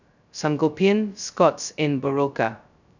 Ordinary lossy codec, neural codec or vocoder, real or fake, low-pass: none; codec, 16 kHz, 0.2 kbps, FocalCodec; fake; 7.2 kHz